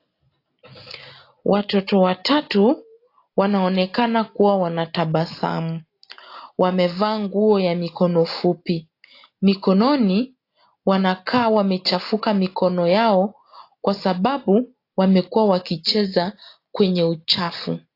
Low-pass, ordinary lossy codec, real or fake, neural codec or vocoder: 5.4 kHz; AAC, 32 kbps; real; none